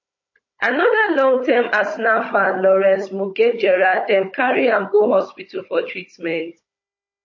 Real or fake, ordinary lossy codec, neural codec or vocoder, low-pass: fake; MP3, 32 kbps; codec, 16 kHz, 16 kbps, FunCodec, trained on Chinese and English, 50 frames a second; 7.2 kHz